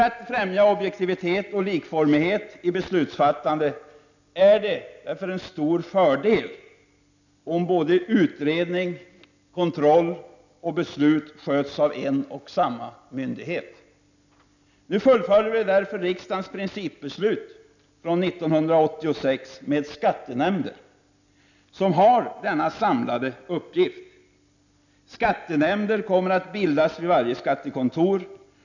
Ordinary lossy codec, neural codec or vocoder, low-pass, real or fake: none; none; 7.2 kHz; real